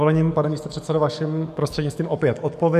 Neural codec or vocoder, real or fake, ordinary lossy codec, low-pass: codec, 44.1 kHz, 7.8 kbps, DAC; fake; MP3, 64 kbps; 14.4 kHz